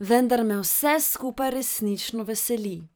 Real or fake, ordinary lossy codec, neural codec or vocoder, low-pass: fake; none; vocoder, 44.1 kHz, 128 mel bands every 512 samples, BigVGAN v2; none